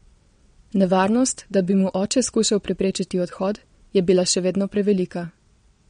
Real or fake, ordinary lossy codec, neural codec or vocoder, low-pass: fake; MP3, 48 kbps; vocoder, 22.05 kHz, 80 mel bands, WaveNeXt; 9.9 kHz